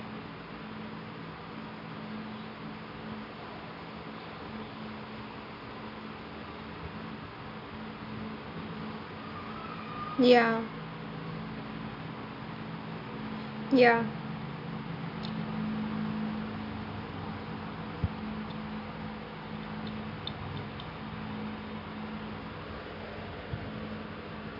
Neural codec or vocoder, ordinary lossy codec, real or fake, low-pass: none; none; real; 5.4 kHz